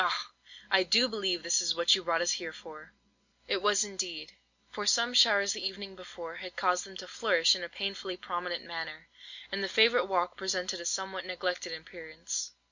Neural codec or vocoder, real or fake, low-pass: none; real; 7.2 kHz